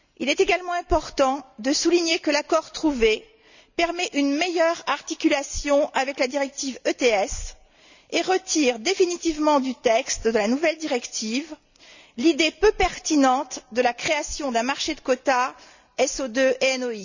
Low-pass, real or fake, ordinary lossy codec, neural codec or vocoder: 7.2 kHz; real; none; none